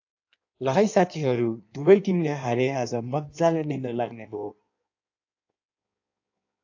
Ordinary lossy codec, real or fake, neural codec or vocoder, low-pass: AAC, 48 kbps; fake; codec, 16 kHz in and 24 kHz out, 1.1 kbps, FireRedTTS-2 codec; 7.2 kHz